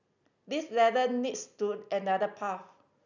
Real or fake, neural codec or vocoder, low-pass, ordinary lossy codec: real; none; 7.2 kHz; none